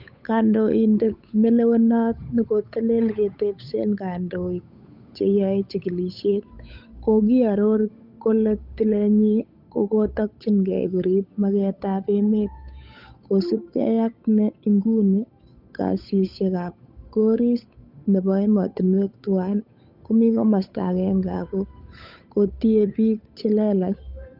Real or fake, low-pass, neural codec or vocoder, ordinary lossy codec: fake; 5.4 kHz; codec, 16 kHz, 8 kbps, FunCodec, trained on Chinese and English, 25 frames a second; none